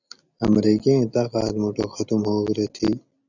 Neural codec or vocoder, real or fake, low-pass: none; real; 7.2 kHz